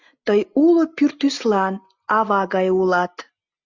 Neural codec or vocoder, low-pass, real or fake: none; 7.2 kHz; real